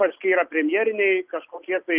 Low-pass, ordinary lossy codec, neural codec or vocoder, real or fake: 3.6 kHz; Opus, 24 kbps; none; real